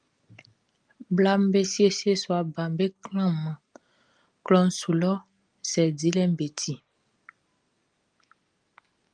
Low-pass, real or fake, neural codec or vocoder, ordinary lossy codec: 9.9 kHz; real; none; Opus, 32 kbps